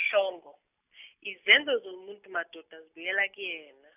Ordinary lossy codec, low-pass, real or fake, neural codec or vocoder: none; 3.6 kHz; real; none